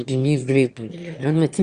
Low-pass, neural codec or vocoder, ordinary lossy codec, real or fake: 9.9 kHz; autoencoder, 22.05 kHz, a latent of 192 numbers a frame, VITS, trained on one speaker; Opus, 64 kbps; fake